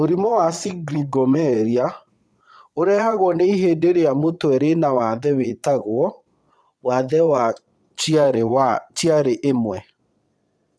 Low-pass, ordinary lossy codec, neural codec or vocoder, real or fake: none; none; vocoder, 22.05 kHz, 80 mel bands, Vocos; fake